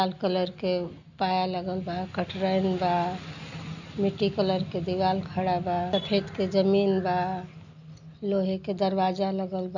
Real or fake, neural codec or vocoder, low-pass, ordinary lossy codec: real; none; 7.2 kHz; none